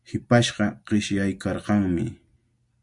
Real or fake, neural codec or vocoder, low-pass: real; none; 10.8 kHz